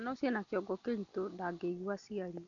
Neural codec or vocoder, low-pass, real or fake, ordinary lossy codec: none; 7.2 kHz; real; none